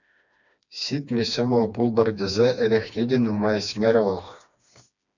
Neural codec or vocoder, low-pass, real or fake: codec, 16 kHz, 2 kbps, FreqCodec, smaller model; 7.2 kHz; fake